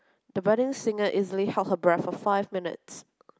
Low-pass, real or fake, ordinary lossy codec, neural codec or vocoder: none; real; none; none